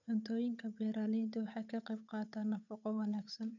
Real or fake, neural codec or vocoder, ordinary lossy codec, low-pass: fake; codec, 16 kHz, 8 kbps, FunCodec, trained on Chinese and English, 25 frames a second; none; 7.2 kHz